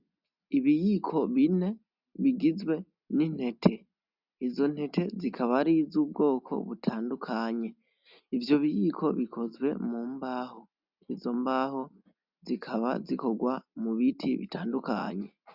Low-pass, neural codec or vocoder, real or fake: 5.4 kHz; none; real